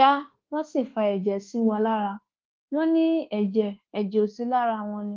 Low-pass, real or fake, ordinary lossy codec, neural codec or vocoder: 7.2 kHz; fake; Opus, 16 kbps; codec, 24 kHz, 1.2 kbps, DualCodec